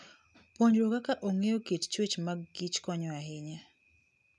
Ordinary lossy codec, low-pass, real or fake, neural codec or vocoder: none; none; real; none